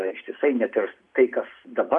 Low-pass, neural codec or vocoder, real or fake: 10.8 kHz; none; real